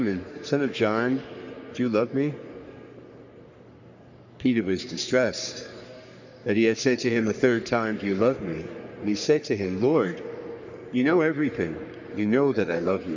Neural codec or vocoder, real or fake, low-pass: codec, 44.1 kHz, 3.4 kbps, Pupu-Codec; fake; 7.2 kHz